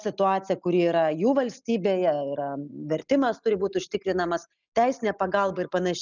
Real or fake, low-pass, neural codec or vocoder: real; 7.2 kHz; none